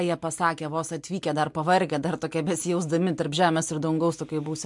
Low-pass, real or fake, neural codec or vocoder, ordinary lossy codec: 10.8 kHz; real; none; MP3, 64 kbps